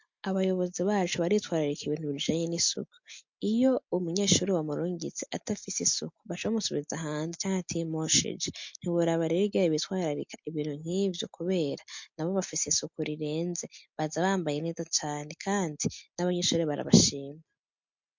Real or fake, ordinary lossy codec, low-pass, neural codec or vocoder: real; MP3, 48 kbps; 7.2 kHz; none